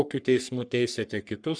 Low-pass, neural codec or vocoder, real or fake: 9.9 kHz; codec, 44.1 kHz, 3.4 kbps, Pupu-Codec; fake